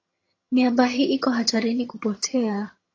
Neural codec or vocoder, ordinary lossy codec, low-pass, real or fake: vocoder, 22.05 kHz, 80 mel bands, HiFi-GAN; AAC, 32 kbps; 7.2 kHz; fake